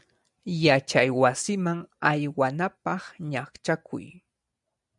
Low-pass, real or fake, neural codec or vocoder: 10.8 kHz; real; none